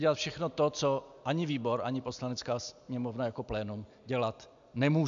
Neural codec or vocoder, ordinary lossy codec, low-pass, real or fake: none; AAC, 64 kbps; 7.2 kHz; real